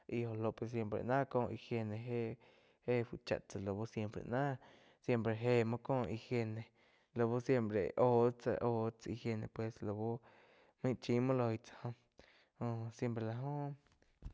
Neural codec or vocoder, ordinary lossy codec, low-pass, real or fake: none; none; none; real